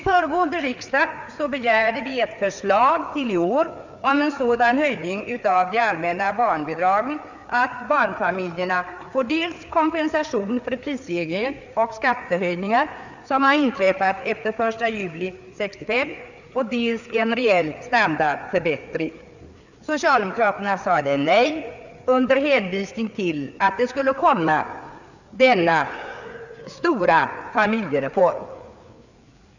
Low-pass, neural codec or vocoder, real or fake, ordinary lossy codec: 7.2 kHz; codec, 16 kHz, 4 kbps, FreqCodec, larger model; fake; none